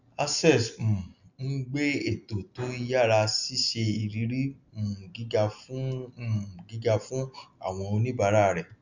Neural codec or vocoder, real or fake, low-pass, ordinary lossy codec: none; real; 7.2 kHz; none